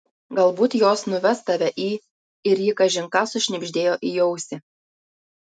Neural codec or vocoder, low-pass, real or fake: none; 9.9 kHz; real